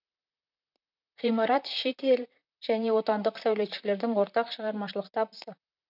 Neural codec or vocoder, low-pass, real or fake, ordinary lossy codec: vocoder, 44.1 kHz, 128 mel bands, Pupu-Vocoder; 5.4 kHz; fake; none